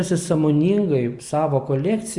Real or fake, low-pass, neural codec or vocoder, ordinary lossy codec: real; 10.8 kHz; none; Opus, 64 kbps